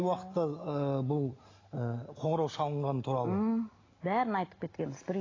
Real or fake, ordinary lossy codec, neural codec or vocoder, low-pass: real; AAC, 32 kbps; none; 7.2 kHz